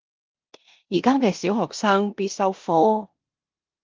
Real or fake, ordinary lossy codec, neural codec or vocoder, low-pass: fake; Opus, 32 kbps; codec, 16 kHz in and 24 kHz out, 0.9 kbps, LongCat-Audio-Codec, four codebook decoder; 7.2 kHz